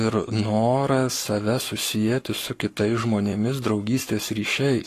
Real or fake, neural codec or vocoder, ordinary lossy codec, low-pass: fake; codec, 44.1 kHz, 7.8 kbps, Pupu-Codec; AAC, 48 kbps; 14.4 kHz